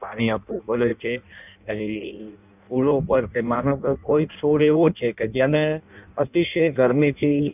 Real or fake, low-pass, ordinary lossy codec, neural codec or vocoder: fake; 3.6 kHz; none; codec, 16 kHz in and 24 kHz out, 0.6 kbps, FireRedTTS-2 codec